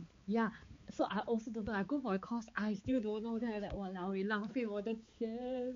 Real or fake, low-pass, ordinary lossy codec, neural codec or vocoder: fake; 7.2 kHz; MP3, 48 kbps; codec, 16 kHz, 4 kbps, X-Codec, HuBERT features, trained on balanced general audio